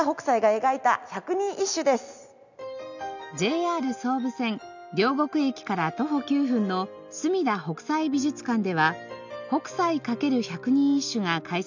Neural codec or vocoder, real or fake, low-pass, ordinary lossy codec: none; real; 7.2 kHz; none